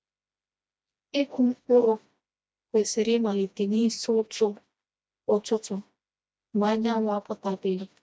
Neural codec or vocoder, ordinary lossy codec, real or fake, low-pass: codec, 16 kHz, 1 kbps, FreqCodec, smaller model; none; fake; none